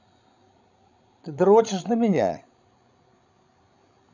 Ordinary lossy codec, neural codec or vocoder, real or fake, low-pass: none; codec, 16 kHz, 16 kbps, FreqCodec, larger model; fake; 7.2 kHz